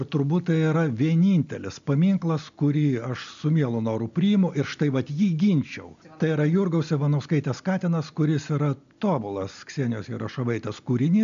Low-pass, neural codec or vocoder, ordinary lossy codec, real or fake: 7.2 kHz; none; MP3, 64 kbps; real